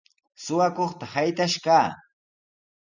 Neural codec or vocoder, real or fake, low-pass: none; real; 7.2 kHz